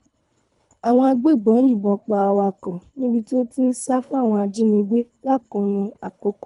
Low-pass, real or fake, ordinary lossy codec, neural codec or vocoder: 10.8 kHz; fake; none; codec, 24 kHz, 3 kbps, HILCodec